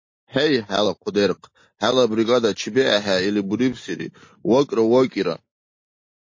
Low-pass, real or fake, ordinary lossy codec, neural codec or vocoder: 7.2 kHz; real; MP3, 32 kbps; none